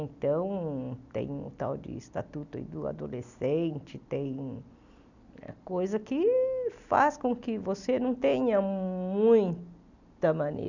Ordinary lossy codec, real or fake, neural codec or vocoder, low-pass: none; real; none; 7.2 kHz